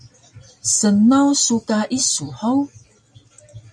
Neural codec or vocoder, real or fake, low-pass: none; real; 9.9 kHz